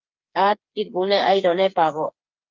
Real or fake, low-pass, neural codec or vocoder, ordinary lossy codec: fake; 7.2 kHz; codec, 16 kHz, 4 kbps, FreqCodec, smaller model; Opus, 24 kbps